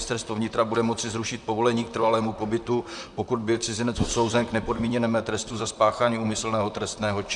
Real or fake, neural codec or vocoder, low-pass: fake; vocoder, 44.1 kHz, 128 mel bands, Pupu-Vocoder; 10.8 kHz